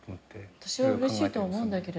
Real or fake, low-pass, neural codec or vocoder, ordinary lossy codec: real; none; none; none